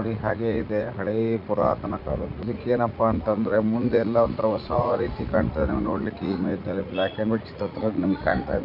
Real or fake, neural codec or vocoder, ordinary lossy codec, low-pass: fake; vocoder, 44.1 kHz, 80 mel bands, Vocos; none; 5.4 kHz